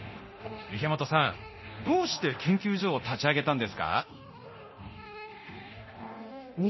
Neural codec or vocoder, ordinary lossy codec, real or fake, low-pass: codec, 24 kHz, 0.9 kbps, DualCodec; MP3, 24 kbps; fake; 7.2 kHz